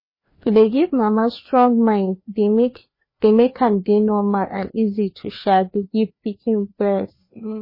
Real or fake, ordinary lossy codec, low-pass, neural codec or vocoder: fake; MP3, 24 kbps; 5.4 kHz; codec, 16 kHz, 2 kbps, FreqCodec, larger model